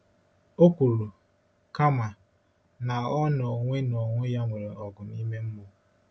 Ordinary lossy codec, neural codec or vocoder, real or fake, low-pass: none; none; real; none